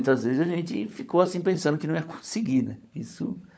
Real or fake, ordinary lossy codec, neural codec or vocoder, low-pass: fake; none; codec, 16 kHz, 16 kbps, FunCodec, trained on LibriTTS, 50 frames a second; none